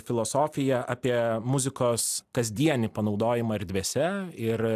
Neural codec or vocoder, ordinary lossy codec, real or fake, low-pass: none; AAC, 96 kbps; real; 14.4 kHz